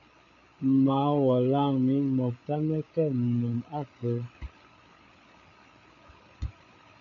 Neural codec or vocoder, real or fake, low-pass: codec, 16 kHz, 8 kbps, FreqCodec, larger model; fake; 7.2 kHz